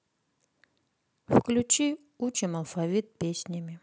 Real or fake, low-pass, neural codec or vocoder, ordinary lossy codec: real; none; none; none